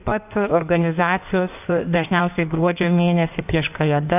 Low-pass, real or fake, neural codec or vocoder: 3.6 kHz; fake; codec, 16 kHz in and 24 kHz out, 1.1 kbps, FireRedTTS-2 codec